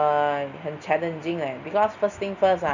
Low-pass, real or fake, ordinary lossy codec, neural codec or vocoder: 7.2 kHz; real; none; none